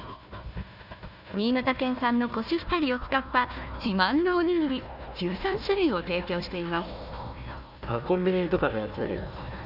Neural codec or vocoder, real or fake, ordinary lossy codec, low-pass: codec, 16 kHz, 1 kbps, FunCodec, trained on Chinese and English, 50 frames a second; fake; none; 5.4 kHz